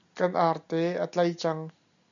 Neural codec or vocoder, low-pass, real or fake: none; 7.2 kHz; real